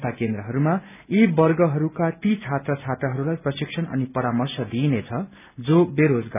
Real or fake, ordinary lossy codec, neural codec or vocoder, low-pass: real; none; none; 3.6 kHz